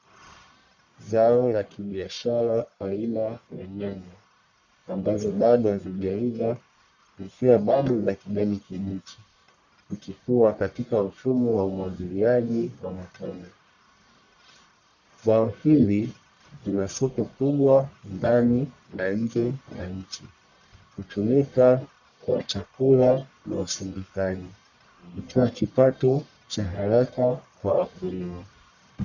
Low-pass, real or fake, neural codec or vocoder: 7.2 kHz; fake; codec, 44.1 kHz, 1.7 kbps, Pupu-Codec